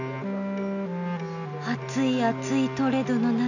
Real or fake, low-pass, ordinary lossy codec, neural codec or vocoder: real; 7.2 kHz; none; none